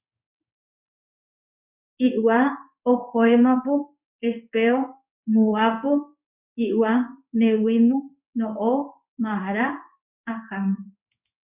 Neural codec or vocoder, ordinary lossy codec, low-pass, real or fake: codec, 16 kHz in and 24 kHz out, 1 kbps, XY-Tokenizer; Opus, 64 kbps; 3.6 kHz; fake